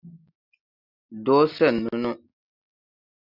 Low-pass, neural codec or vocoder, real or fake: 5.4 kHz; none; real